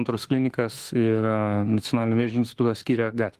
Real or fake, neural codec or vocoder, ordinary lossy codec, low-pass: fake; autoencoder, 48 kHz, 32 numbers a frame, DAC-VAE, trained on Japanese speech; Opus, 24 kbps; 14.4 kHz